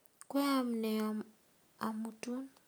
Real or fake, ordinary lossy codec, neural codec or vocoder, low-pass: real; none; none; none